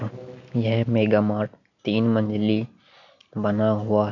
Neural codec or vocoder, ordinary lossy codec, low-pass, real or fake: none; none; 7.2 kHz; real